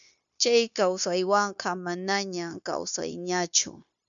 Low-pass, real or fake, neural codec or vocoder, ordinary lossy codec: 7.2 kHz; fake; codec, 16 kHz, 0.9 kbps, LongCat-Audio-Codec; MP3, 96 kbps